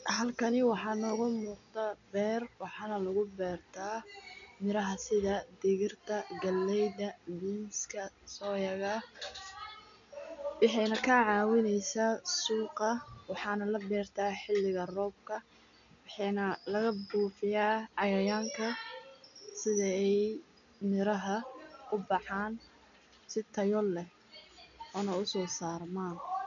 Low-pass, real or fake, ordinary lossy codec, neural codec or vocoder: 7.2 kHz; real; none; none